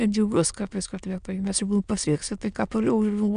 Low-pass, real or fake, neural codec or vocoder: 9.9 kHz; fake; autoencoder, 22.05 kHz, a latent of 192 numbers a frame, VITS, trained on many speakers